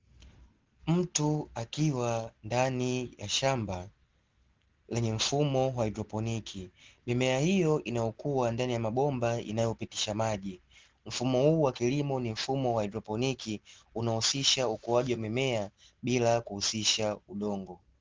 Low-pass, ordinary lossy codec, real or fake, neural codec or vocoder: 7.2 kHz; Opus, 16 kbps; real; none